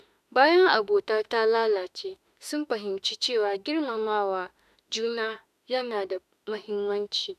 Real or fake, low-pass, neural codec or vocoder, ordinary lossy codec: fake; 14.4 kHz; autoencoder, 48 kHz, 32 numbers a frame, DAC-VAE, trained on Japanese speech; none